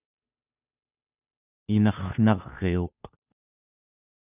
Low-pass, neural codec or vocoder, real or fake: 3.6 kHz; codec, 16 kHz, 2 kbps, FunCodec, trained on Chinese and English, 25 frames a second; fake